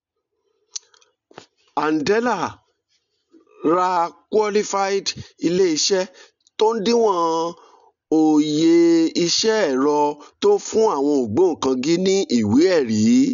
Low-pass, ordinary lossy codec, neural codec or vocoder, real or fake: 7.2 kHz; none; none; real